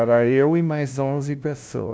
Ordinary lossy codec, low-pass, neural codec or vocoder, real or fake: none; none; codec, 16 kHz, 0.5 kbps, FunCodec, trained on LibriTTS, 25 frames a second; fake